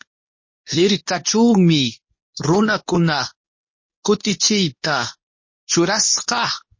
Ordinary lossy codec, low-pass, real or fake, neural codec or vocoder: MP3, 32 kbps; 7.2 kHz; fake; codec, 24 kHz, 0.9 kbps, WavTokenizer, medium speech release version 2